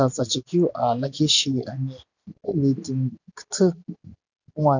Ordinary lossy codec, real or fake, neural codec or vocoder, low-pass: none; real; none; 7.2 kHz